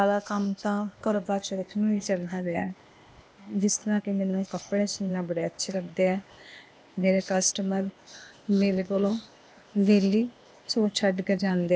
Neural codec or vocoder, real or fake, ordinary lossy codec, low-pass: codec, 16 kHz, 0.8 kbps, ZipCodec; fake; none; none